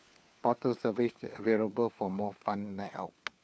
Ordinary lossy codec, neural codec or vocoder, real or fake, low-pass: none; codec, 16 kHz, 4 kbps, FunCodec, trained on LibriTTS, 50 frames a second; fake; none